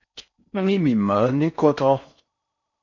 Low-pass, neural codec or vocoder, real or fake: 7.2 kHz; codec, 16 kHz in and 24 kHz out, 0.6 kbps, FocalCodec, streaming, 4096 codes; fake